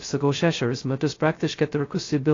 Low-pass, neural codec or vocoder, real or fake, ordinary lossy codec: 7.2 kHz; codec, 16 kHz, 0.2 kbps, FocalCodec; fake; AAC, 32 kbps